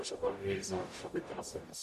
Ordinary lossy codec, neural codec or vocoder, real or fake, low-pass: AAC, 96 kbps; codec, 44.1 kHz, 0.9 kbps, DAC; fake; 14.4 kHz